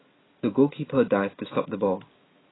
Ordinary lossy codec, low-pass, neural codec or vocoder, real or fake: AAC, 16 kbps; 7.2 kHz; none; real